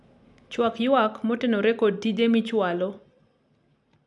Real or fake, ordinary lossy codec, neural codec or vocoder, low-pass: real; none; none; 10.8 kHz